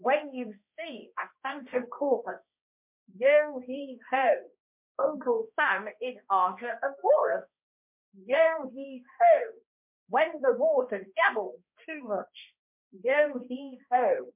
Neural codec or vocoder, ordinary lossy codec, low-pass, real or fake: codec, 16 kHz, 1 kbps, X-Codec, HuBERT features, trained on balanced general audio; MP3, 32 kbps; 3.6 kHz; fake